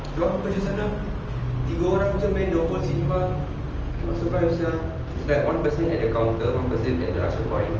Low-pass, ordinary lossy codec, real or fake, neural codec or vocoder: 7.2 kHz; Opus, 24 kbps; real; none